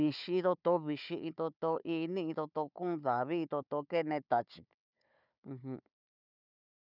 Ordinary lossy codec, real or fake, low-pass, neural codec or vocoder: none; fake; 5.4 kHz; codec, 16 kHz, 4 kbps, FunCodec, trained on Chinese and English, 50 frames a second